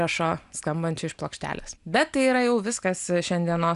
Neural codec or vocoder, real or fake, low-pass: none; real; 10.8 kHz